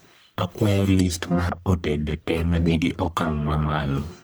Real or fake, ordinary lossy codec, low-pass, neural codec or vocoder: fake; none; none; codec, 44.1 kHz, 1.7 kbps, Pupu-Codec